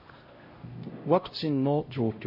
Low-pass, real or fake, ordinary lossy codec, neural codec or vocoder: 5.4 kHz; fake; MP3, 24 kbps; codec, 16 kHz, 0.5 kbps, X-Codec, HuBERT features, trained on LibriSpeech